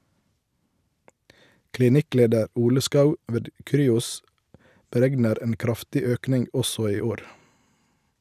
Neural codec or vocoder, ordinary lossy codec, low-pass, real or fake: none; none; 14.4 kHz; real